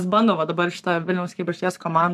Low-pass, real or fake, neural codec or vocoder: 14.4 kHz; fake; codec, 44.1 kHz, 7.8 kbps, Pupu-Codec